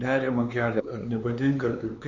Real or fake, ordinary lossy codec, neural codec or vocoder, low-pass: fake; Opus, 64 kbps; codec, 16 kHz, 4 kbps, X-Codec, HuBERT features, trained on LibriSpeech; 7.2 kHz